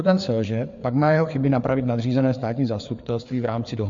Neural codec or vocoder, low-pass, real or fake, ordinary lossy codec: codec, 16 kHz, 4 kbps, FreqCodec, larger model; 7.2 kHz; fake; MP3, 48 kbps